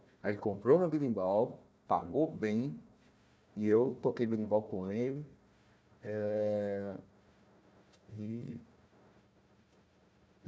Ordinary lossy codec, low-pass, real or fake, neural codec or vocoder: none; none; fake; codec, 16 kHz, 1 kbps, FunCodec, trained on Chinese and English, 50 frames a second